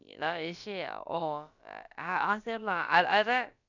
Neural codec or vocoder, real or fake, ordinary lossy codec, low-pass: codec, 16 kHz, about 1 kbps, DyCAST, with the encoder's durations; fake; none; 7.2 kHz